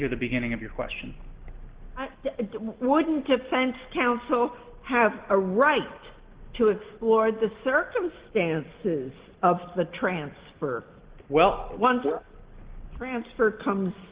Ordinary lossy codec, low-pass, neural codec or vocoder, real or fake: Opus, 16 kbps; 3.6 kHz; none; real